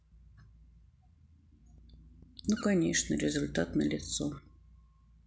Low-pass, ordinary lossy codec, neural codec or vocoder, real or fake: none; none; none; real